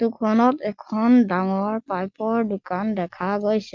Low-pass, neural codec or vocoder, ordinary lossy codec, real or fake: 7.2 kHz; none; Opus, 24 kbps; real